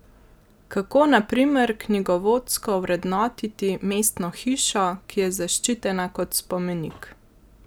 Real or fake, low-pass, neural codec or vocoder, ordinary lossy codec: real; none; none; none